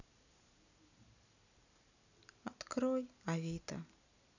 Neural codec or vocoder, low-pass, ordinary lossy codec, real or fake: none; 7.2 kHz; none; real